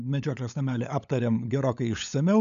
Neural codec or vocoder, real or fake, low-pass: codec, 16 kHz, 16 kbps, FunCodec, trained on LibriTTS, 50 frames a second; fake; 7.2 kHz